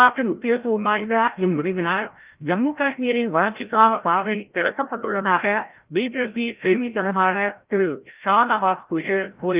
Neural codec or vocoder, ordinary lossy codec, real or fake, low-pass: codec, 16 kHz, 0.5 kbps, FreqCodec, larger model; Opus, 32 kbps; fake; 3.6 kHz